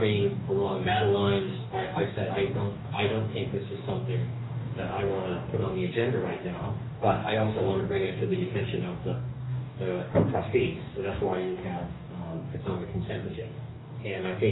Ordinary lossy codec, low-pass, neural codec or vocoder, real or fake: AAC, 16 kbps; 7.2 kHz; codec, 44.1 kHz, 2.6 kbps, DAC; fake